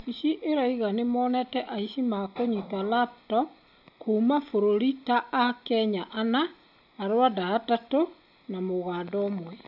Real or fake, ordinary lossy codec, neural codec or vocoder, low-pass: real; none; none; 5.4 kHz